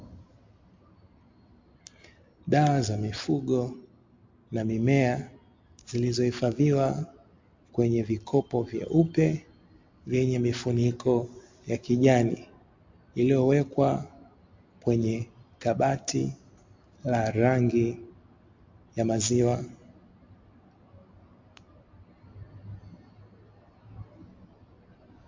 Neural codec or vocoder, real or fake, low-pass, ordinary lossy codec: none; real; 7.2 kHz; MP3, 48 kbps